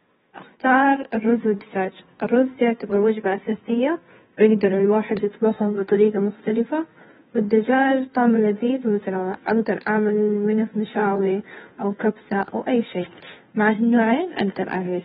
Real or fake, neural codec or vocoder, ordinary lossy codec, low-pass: fake; codec, 16 kHz, 2 kbps, FunCodec, trained on Chinese and English, 25 frames a second; AAC, 16 kbps; 7.2 kHz